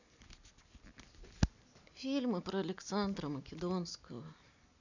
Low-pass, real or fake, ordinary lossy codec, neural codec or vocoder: 7.2 kHz; real; none; none